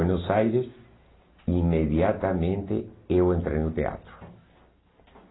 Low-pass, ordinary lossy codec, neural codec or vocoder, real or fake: 7.2 kHz; AAC, 16 kbps; none; real